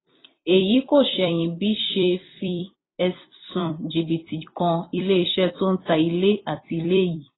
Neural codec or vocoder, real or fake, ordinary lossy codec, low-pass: vocoder, 44.1 kHz, 128 mel bands every 256 samples, BigVGAN v2; fake; AAC, 16 kbps; 7.2 kHz